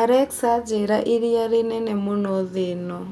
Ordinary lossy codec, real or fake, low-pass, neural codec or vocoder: none; real; 19.8 kHz; none